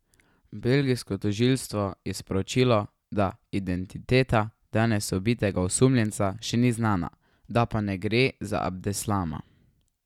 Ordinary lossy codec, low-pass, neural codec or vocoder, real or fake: none; 19.8 kHz; none; real